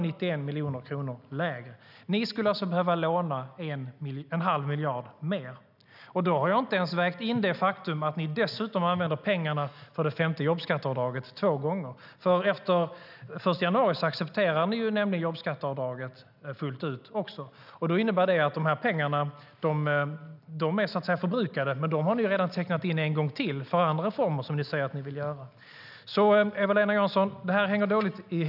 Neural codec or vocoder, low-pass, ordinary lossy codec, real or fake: none; 5.4 kHz; none; real